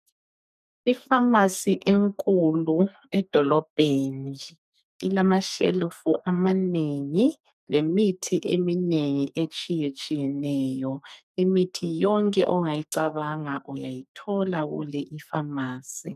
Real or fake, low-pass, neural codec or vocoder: fake; 14.4 kHz; codec, 44.1 kHz, 2.6 kbps, SNAC